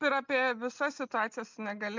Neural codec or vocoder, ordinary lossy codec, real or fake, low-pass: none; MP3, 64 kbps; real; 7.2 kHz